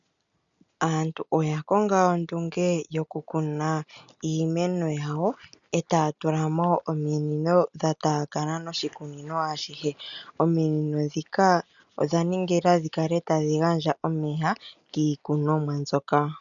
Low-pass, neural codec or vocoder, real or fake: 7.2 kHz; none; real